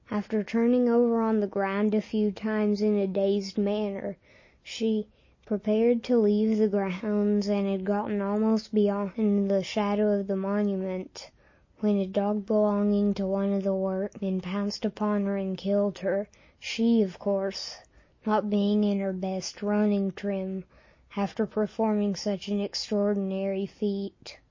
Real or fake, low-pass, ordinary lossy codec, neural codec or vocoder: real; 7.2 kHz; MP3, 32 kbps; none